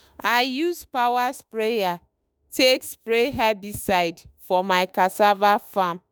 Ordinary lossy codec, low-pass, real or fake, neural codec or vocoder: none; none; fake; autoencoder, 48 kHz, 32 numbers a frame, DAC-VAE, trained on Japanese speech